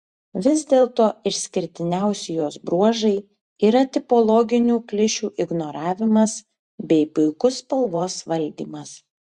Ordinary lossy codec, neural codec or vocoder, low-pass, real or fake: Opus, 64 kbps; vocoder, 44.1 kHz, 128 mel bands every 512 samples, BigVGAN v2; 10.8 kHz; fake